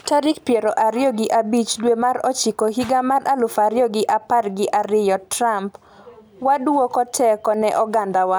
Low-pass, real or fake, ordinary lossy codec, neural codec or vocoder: none; real; none; none